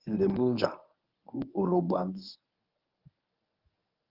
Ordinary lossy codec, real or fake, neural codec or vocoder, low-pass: Opus, 24 kbps; fake; codec, 24 kHz, 0.9 kbps, WavTokenizer, medium speech release version 1; 5.4 kHz